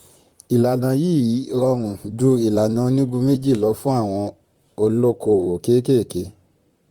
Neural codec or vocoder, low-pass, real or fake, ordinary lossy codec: vocoder, 44.1 kHz, 128 mel bands, Pupu-Vocoder; 19.8 kHz; fake; Opus, 32 kbps